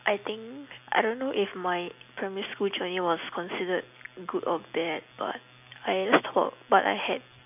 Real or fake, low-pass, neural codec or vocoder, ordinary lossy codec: real; 3.6 kHz; none; none